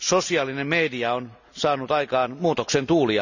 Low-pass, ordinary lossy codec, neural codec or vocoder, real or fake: 7.2 kHz; none; none; real